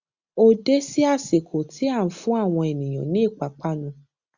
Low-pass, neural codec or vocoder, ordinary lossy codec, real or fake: 7.2 kHz; none; Opus, 64 kbps; real